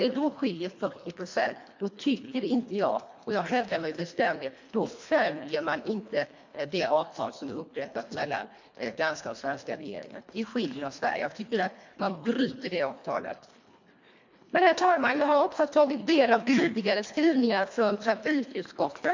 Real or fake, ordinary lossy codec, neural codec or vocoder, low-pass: fake; MP3, 48 kbps; codec, 24 kHz, 1.5 kbps, HILCodec; 7.2 kHz